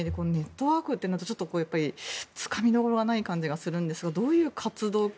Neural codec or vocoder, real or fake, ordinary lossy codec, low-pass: none; real; none; none